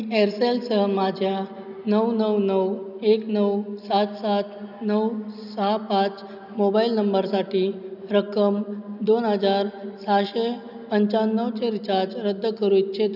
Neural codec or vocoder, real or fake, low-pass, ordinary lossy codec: none; real; 5.4 kHz; none